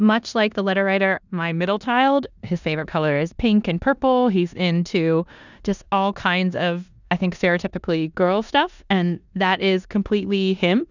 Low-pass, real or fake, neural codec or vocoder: 7.2 kHz; fake; codec, 16 kHz in and 24 kHz out, 0.9 kbps, LongCat-Audio-Codec, four codebook decoder